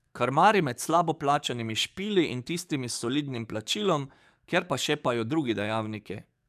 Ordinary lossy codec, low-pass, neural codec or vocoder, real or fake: none; 14.4 kHz; codec, 44.1 kHz, 7.8 kbps, DAC; fake